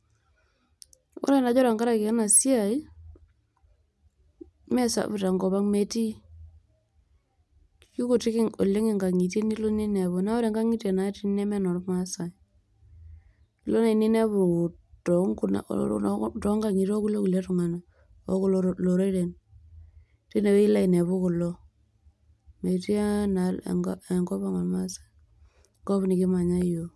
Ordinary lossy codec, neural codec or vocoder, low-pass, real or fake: none; none; none; real